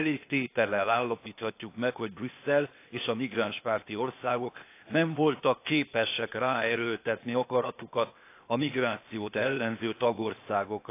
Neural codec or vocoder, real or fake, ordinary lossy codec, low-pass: codec, 16 kHz, 0.8 kbps, ZipCodec; fake; AAC, 24 kbps; 3.6 kHz